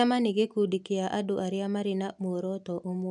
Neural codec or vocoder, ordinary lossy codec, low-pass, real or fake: none; none; 10.8 kHz; real